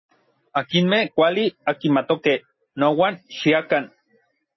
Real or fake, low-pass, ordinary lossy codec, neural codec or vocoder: real; 7.2 kHz; MP3, 24 kbps; none